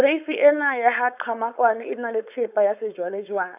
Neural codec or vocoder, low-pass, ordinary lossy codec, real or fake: codec, 16 kHz, 4.8 kbps, FACodec; 3.6 kHz; none; fake